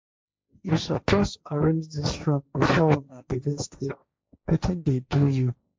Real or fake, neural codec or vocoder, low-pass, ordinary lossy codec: fake; codec, 16 kHz, 1.1 kbps, Voila-Tokenizer; none; none